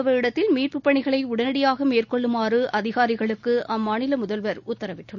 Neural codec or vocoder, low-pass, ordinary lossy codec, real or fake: none; 7.2 kHz; none; real